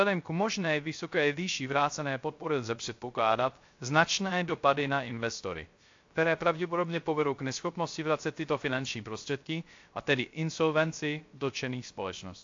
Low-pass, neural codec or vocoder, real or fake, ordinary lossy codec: 7.2 kHz; codec, 16 kHz, 0.3 kbps, FocalCodec; fake; AAC, 48 kbps